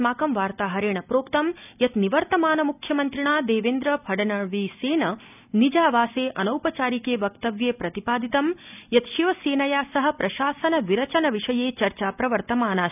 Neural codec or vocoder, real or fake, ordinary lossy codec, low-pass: none; real; none; 3.6 kHz